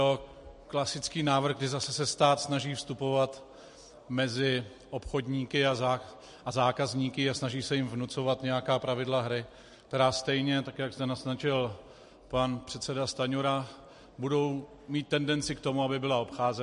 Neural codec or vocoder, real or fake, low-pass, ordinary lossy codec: none; real; 10.8 kHz; MP3, 48 kbps